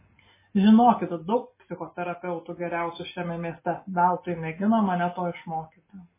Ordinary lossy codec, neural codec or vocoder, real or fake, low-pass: MP3, 16 kbps; none; real; 3.6 kHz